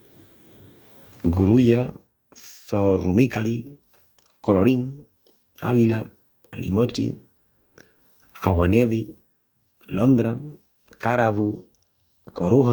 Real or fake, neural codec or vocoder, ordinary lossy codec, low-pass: fake; codec, 44.1 kHz, 2.6 kbps, DAC; none; none